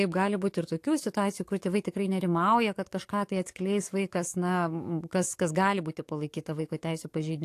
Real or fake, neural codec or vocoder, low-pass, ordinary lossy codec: fake; codec, 44.1 kHz, 7.8 kbps, DAC; 14.4 kHz; AAC, 64 kbps